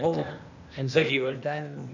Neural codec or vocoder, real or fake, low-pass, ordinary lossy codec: codec, 16 kHz, 0.8 kbps, ZipCodec; fake; 7.2 kHz; none